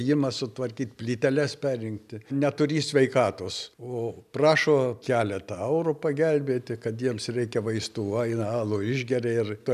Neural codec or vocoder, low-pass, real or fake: none; 14.4 kHz; real